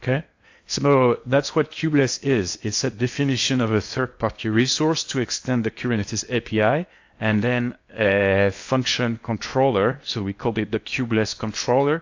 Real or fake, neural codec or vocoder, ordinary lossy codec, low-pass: fake; codec, 16 kHz in and 24 kHz out, 0.8 kbps, FocalCodec, streaming, 65536 codes; AAC, 48 kbps; 7.2 kHz